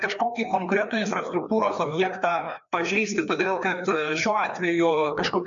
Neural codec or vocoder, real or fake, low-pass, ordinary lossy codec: codec, 16 kHz, 2 kbps, FreqCodec, larger model; fake; 7.2 kHz; MP3, 64 kbps